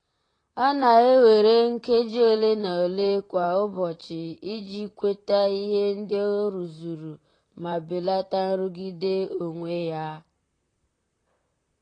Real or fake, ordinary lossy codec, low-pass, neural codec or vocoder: fake; AAC, 32 kbps; 9.9 kHz; vocoder, 44.1 kHz, 128 mel bands, Pupu-Vocoder